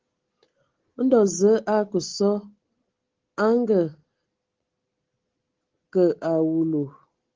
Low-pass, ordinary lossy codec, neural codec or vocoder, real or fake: 7.2 kHz; Opus, 16 kbps; none; real